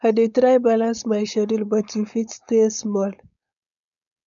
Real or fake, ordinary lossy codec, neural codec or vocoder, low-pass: fake; none; codec, 16 kHz, 4.8 kbps, FACodec; 7.2 kHz